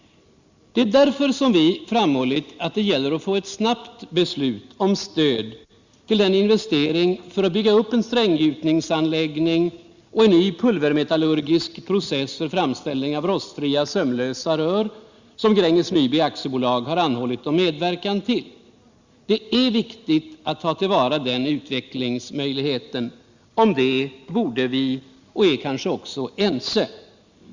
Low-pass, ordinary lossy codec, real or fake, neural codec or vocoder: 7.2 kHz; Opus, 64 kbps; real; none